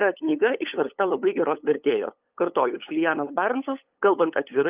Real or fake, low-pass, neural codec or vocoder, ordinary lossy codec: fake; 3.6 kHz; codec, 16 kHz, 8 kbps, FunCodec, trained on LibriTTS, 25 frames a second; Opus, 32 kbps